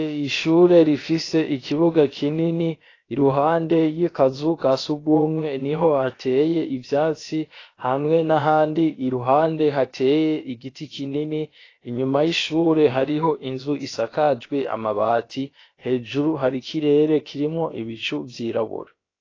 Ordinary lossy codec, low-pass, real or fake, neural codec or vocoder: AAC, 32 kbps; 7.2 kHz; fake; codec, 16 kHz, about 1 kbps, DyCAST, with the encoder's durations